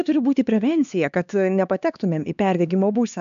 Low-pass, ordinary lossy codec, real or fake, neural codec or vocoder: 7.2 kHz; AAC, 96 kbps; fake; codec, 16 kHz, 2 kbps, X-Codec, HuBERT features, trained on LibriSpeech